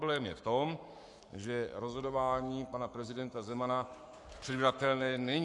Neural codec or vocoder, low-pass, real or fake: codec, 44.1 kHz, 7.8 kbps, Pupu-Codec; 10.8 kHz; fake